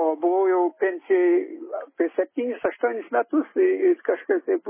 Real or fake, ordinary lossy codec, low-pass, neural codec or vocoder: real; MP3, 16 kbps; 3.6 kHz; none